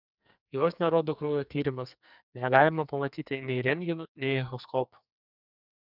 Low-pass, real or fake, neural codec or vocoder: 5.4 kHz; fake; codec, 44.1 kHz, 2.6 kbps, SNAC